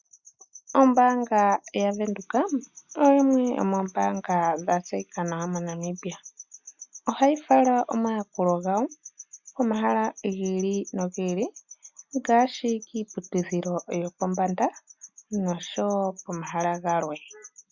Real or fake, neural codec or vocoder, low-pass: real; none; 7.2 kHz